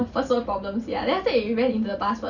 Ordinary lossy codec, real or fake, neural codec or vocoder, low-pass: none; real; none; 7.2 kHz